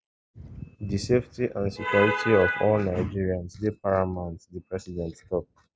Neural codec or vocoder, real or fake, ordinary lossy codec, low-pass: none; real; none; none